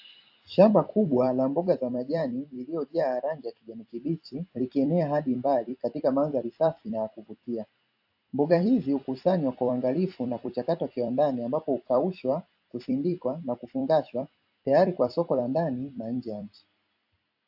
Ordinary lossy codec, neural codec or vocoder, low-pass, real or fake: AAC, 48 kbps; vocoder, 44.1 kHz, 128 mel bands every 256 samples, BigVGAN v2; 5.4 kHz; fake